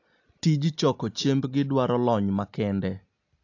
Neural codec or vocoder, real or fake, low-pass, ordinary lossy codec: none; real; 7.2 kHz; AAC, 48 kbps